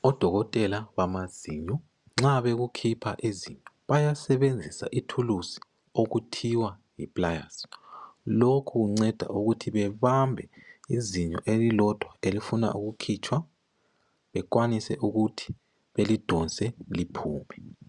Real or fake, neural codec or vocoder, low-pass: real; none; 10.8 kHz